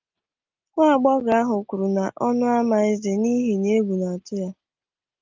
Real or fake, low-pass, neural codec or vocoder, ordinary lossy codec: real; 7.2 kHz; none; Opus, 24 kbps